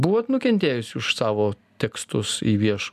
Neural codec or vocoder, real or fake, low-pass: none; real; 14.4 kHz